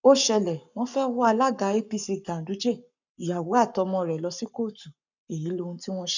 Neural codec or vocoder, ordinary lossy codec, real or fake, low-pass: codec, 44.1 kHz, 7.8 kbps, Pupu-Codec; none; fake; 7.2 kHz